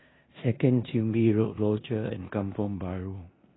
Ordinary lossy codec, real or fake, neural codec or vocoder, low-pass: AAC, 16 kbps; fake; codec, 16 kHz in and 24 kHz out, 0.9 kbps, LongCat-Audio-Codec, four codebook decoder; 7.2 kHz